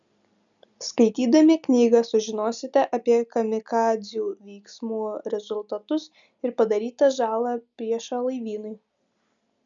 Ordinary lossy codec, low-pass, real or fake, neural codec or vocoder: MP3, 96 kbps; 7.2 kHz; real; none